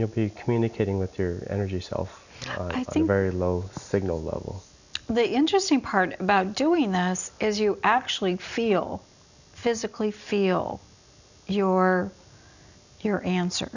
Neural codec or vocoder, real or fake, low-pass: none; real; 7.2 kHz